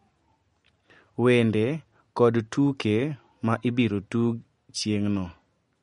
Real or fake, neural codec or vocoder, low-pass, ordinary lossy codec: real; none; 19.8 kHz; MP3, 48 kbps